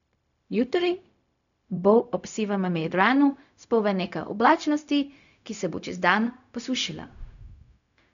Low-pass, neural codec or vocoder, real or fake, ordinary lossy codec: 7.2 kHz; codec, 16 kHz, 0.4 kbps, LongCat-Audio-Codec; fake; none